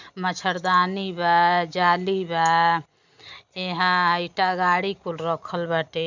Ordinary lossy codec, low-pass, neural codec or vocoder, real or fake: none; 7.2 kHz; none; real